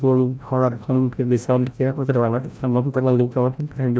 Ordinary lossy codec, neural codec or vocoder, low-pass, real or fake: none; codec, 16 kHz, 0.5 kbps, FreqCodec, larger model; none; fake